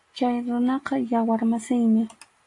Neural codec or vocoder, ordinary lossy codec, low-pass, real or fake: none; AAC, 48 kbps; 10.8 kHz; real